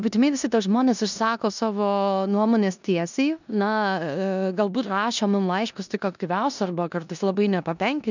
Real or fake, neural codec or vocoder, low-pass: fake; codec, 16 kHz in and 24 kHz out, 0.9 kbps, LongCat-Audio-Codec, four codebook decoder; 7.2 kHz